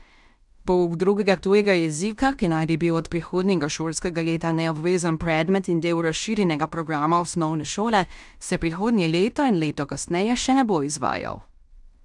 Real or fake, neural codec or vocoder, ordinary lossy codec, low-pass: fake; codec, 16 kHz in and 24 kHz out, 0.9 kbps, LongCat-Audio-Codec, fine tuned four codebook decoder; none; 10.8 kHz